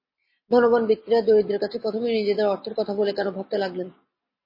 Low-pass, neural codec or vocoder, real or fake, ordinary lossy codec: 5.4 kHz; none; real; MP3, 24 kbps